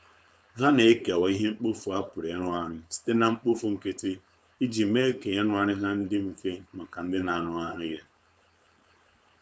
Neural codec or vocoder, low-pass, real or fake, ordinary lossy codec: codec, 16 kHz, 4.8 kbps, FACodec; none; fake; none